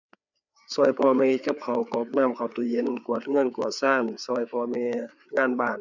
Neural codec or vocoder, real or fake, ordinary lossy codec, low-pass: codec, 16 kHz, 4 kbps, FreqCodec, larger model; fake; none; 7.2 kHz